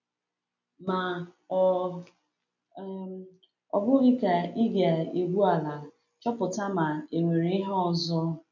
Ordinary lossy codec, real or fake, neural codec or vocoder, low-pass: none; real; none; 7.2 kHz